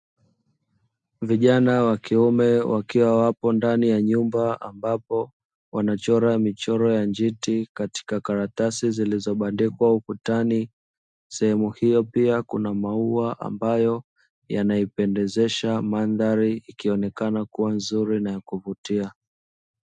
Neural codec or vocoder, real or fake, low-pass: none; real; 9.9 kHz